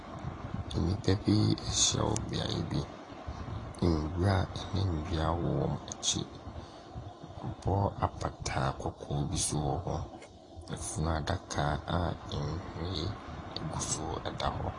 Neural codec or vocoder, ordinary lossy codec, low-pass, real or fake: none; AAC, 32 kbps; 10.8 kHz; real